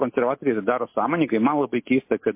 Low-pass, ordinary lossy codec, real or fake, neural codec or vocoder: 3.6 kHz; MP3, 24 kbps; real; none